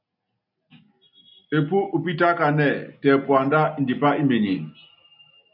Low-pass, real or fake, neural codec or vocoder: 5.4 kHz; real; none